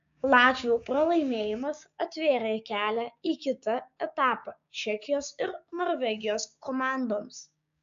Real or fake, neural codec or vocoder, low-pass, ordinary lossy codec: fake; codec, 16 kHz, 6 kbps, DAC; 7.2 kHz; AAC, 64 kbps